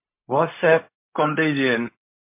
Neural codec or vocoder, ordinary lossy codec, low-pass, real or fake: codec, 16 kHz, 0.4 kbps, LongCat-Audio-Codec; MP3, 32 kbps; 3.6 kHz; fake